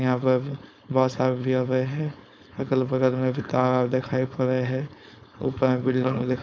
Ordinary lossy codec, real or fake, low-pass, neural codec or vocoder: none; fake; none; codec, 16 kHz, 4.8 kbps, FACodec